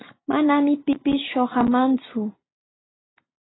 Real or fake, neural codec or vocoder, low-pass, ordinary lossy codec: real; none; 7.2 kHz; AAC, 16 kbps